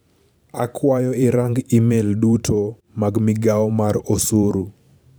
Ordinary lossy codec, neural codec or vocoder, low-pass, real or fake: none; vocoder, 44.1 kHz, 128 mel bands every 512 samples, BigVGAN v2; none; fake